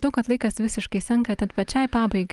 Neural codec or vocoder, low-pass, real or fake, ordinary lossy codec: none; 10.8 kHz; real; Opus, 24 kbps